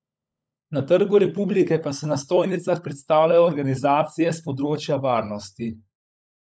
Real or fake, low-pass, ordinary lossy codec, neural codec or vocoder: fake; none; none; codec, 16 kHz, 16 kbps, FunCodec, trained on LibriTTS, 50 frames a second